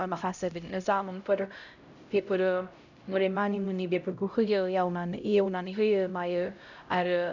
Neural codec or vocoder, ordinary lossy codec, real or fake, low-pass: codec, 16 kHz, 0.5 kbps, X-Codec, HuBERT features, trained on LibriSpeech; none; fake; 7.2 kHz